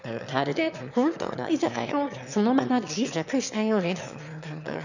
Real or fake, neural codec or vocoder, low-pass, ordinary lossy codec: fake; autoencoder, 22.05 kHz, a latent of 192 numbers a frame, VITS, trained on one speaker; 7.2 kHz; none